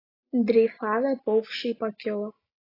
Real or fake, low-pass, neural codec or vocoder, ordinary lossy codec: real; 5.4 kHz; none; AAC, 24 kbps